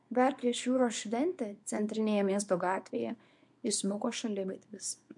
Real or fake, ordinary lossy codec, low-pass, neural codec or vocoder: fake; MP3, 64 kbps; 10.8 kHz; codec, 24 kHz, 0.9 kbps, WavTokenizer, small release